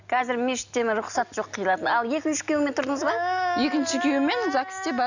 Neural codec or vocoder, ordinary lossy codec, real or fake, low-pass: none; none; real; 7.2 kHz